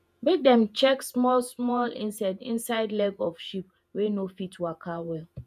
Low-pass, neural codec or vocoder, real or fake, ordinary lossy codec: 14.4 kHz; vocoder, 48 kHz, 128 mel bands, Vocos; fake; none